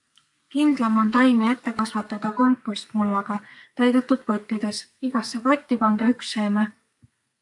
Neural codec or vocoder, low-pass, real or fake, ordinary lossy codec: codec, 32 kHz, 1.9 kbps, SNAC; 10.8 kHz; fake; AAC, 64 kbps